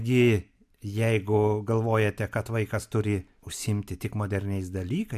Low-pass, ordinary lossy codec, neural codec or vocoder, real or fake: 14.4 kHz; MP3, 96 kbps; none; real